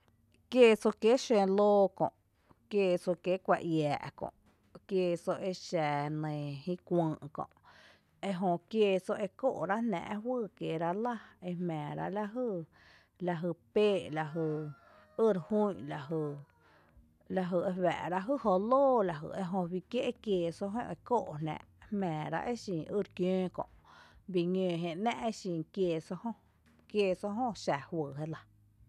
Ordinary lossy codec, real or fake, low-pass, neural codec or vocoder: none; real; 14.4 kHz; none